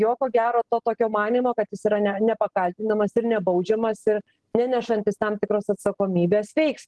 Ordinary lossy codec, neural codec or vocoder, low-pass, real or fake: Opus, 16 kbps; none; 10.8 kHz; real